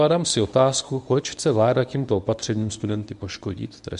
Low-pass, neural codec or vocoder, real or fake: 10.8 kHz; codec, 24 kHz, 0.9 kbps, WavTokenizer, medium speech release version 1; fake